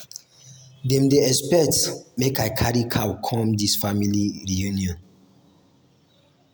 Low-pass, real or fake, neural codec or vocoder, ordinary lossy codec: none; real; none; none